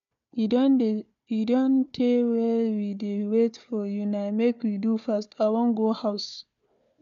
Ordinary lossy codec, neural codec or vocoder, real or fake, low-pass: MP3, 96 kbps; codec, 16 kHz, 4 kbps, FunCodec, trained on Chinese and English, 50 frames a second; fake; 7.2 kHz